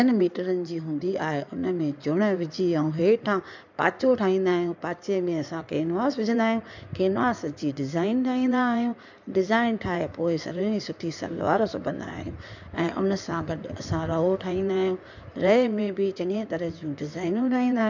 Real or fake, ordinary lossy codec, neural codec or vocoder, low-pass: fake; none; codec, 16 kHz in and 24 kHz out, 2.2 kbps, FireRedTTS-2 codec; 7.2 kHz